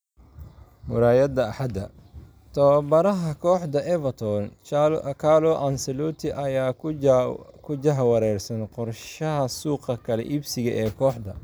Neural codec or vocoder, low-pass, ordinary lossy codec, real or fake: none; none; none; real